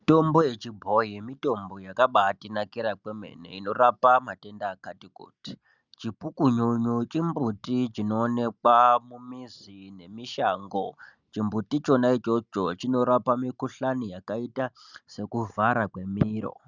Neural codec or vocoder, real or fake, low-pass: none; real; 7.2 kHz